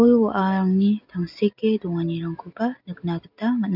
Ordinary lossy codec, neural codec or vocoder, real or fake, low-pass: none; none; real; 5.4 kHz